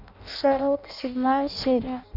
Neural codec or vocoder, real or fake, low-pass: codec, 16 kHz in and 24 kHz out, 0.6 kbps, FireRedTTS-2 codec; fake; 5.4 kHz